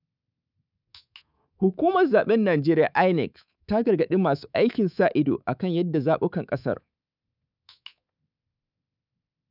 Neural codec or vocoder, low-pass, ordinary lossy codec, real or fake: codec, 24 kHz, 3.1 kbps, DualCodec; 5.4 kHz; none; fake